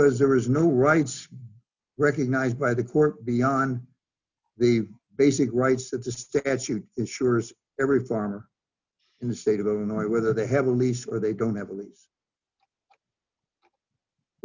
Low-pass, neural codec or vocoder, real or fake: 7.2 kHz; none; real